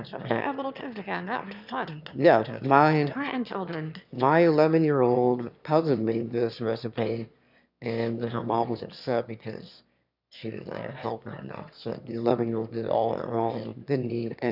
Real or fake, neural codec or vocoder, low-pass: fake; autoencoder, 22.05 kHz, a latent of 192 numbers a frame, VITS, trained on one speaker; 5.4 kHz